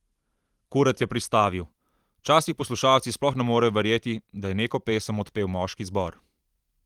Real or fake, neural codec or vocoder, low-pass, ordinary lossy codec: fake; vocoder, 44.1 kHz, 128 mel bands every 512 samples, BigVGAN v2; 19.8 kHz; Opus, 24 kbps